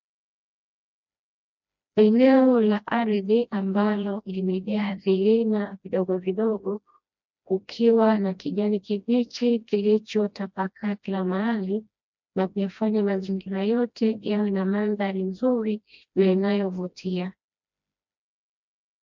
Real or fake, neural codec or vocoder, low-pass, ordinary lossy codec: fake; codec, 16 kHz, 1 kbps, FreqCodec, smaller model; 7.2 kHz; MP3, 64 kbps